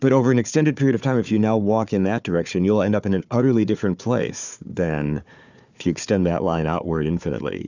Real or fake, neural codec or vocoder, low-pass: fake; codec, 16 kHz, 4 kbps, FunCodec, trained on Chinese and English, 50 frames a second; 7.2 kHz